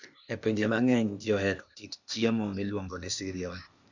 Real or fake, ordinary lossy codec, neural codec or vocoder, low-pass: fake; none; codec, 16 kHz, 0.8 kbps, ZipCodec; 7.2 kHz